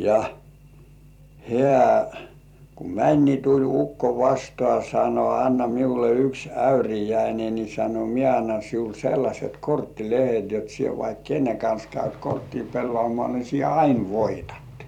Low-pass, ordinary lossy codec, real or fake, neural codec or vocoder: 19.8 kHz; none; fake; vocoder, 44.1 kHz, 128 mel bands every 256 samples, BigVGAN v2